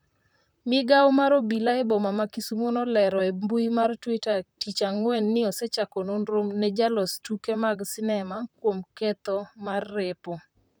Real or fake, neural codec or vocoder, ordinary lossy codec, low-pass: fake; vocoder, 44.1 kHz, 128 mel bands, Pupu-Vocoder; none; none